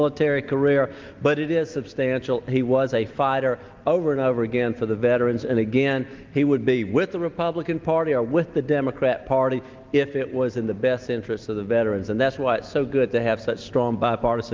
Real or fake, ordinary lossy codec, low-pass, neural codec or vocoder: real; Opus, 24 kbps; 7.2 kHz; none